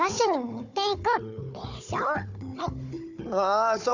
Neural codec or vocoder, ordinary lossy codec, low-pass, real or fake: codec, 16 kHz, 4 kbps, FunCodec, trained on Chinese and English, 50 frames a second; none; 7.2 kHz; fake